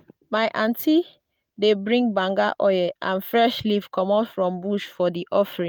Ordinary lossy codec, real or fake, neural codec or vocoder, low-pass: none; real; none; none